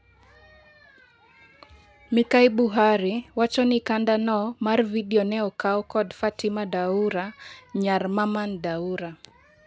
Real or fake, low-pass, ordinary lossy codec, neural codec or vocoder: real; none; none; none